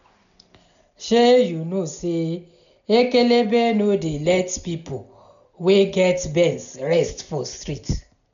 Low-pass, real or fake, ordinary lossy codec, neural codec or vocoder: 7.2 kHz; real; MP3, 96 kbps; none